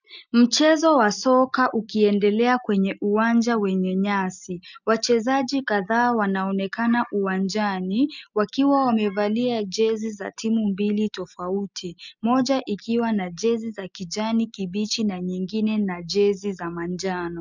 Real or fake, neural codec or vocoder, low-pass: real; none; 7.2 kHz